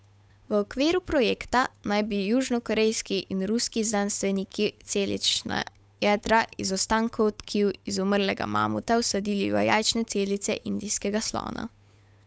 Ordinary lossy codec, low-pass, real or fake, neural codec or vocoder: none; none; fake; codec, 16 kHz, 8 kbps, FunCodec, trained on Chinese and English, 25 frames a second